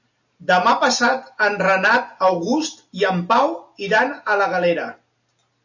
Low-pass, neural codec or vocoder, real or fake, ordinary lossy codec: 7.2 kHz; none; real; AAC, 48 kbps